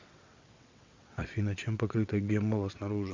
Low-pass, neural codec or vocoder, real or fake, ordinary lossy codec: 7.2 kHz; vocoder, 22.05 kHz, 80 mel bands, WaveNeXt; fake; MP3, 64 kbps